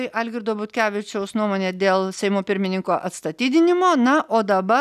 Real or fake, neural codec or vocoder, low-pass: real; none; 14.4 kHz